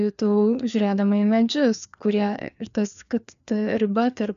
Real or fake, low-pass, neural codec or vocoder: fake; 7.2 kHz; codec, 16 kHz, 2 kbps, FreqCodec, larger model